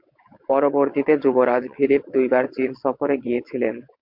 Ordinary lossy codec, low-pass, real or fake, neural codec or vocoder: MP3, 48 kbps; 5.4 kHz; fake; vocoder, 22.05 kHz, 80 mel bands, WaveNeXt